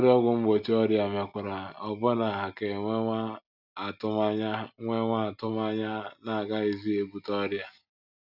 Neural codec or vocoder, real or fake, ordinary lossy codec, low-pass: none; real; none; 5.4 kHz